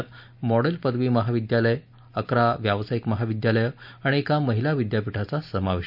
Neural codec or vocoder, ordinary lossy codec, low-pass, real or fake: none; none; 5.4 kHz; real